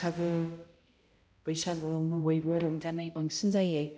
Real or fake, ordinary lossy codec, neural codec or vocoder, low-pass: fake; none; codec, 16 kHz, 0.5 kbps, X-Codec, HuBERT features, trained on balanced general audio; none